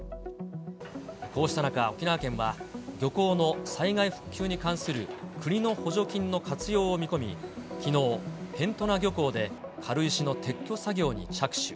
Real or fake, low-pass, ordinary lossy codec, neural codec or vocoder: real; none; none; none